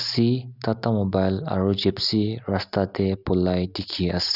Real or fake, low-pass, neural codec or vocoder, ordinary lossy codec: real; 5.4 kHz; none; none